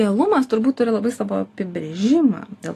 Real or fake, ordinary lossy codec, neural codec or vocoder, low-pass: real; AAC, 48 kbps; none; 14.4 kHz